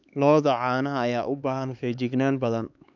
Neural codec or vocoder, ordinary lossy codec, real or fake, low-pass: codec, 16 kHz, 4 kbps, X-Codec, HuBERT features, trained on LibriSpeech; none; fake; 7.2 kHz